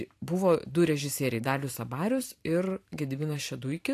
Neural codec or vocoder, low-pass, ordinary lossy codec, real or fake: vocoder, 44.1 kHz, 128 mel bands every 512 samples, BigVGAN v2; 14.4 kHz; AAC, 64 kbps; fake